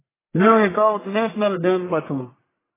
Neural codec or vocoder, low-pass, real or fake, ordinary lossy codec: codec, 44.1 kHz, 1.7 kbps, Pupu-Codec; 3.6 kHz; fake; AAC, 16 kbps